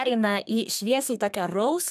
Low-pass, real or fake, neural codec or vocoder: 14.4 kHz; fake; codec, 32 kHz, 1.9 kbps, SNAC